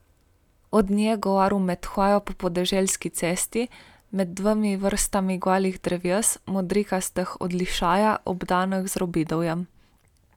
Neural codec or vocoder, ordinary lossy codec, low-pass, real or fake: none; none; 19.8 kHz; real